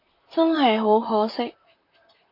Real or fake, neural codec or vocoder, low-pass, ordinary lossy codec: fake; vocoder, 44.1 kHz, 128 mel bands, Pupu-Vocoder; 5.4 kHz; MP3, 32 kbps